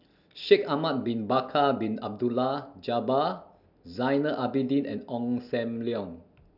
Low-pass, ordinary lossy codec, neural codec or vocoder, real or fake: 5.4 kHz; none; none; real